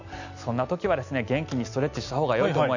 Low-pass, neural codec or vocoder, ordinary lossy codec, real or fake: 7.2 kHz; none; none; real